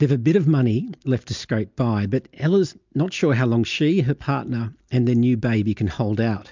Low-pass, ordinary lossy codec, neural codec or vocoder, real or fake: 7.2 kHz; MP3, 64 kbps; none; real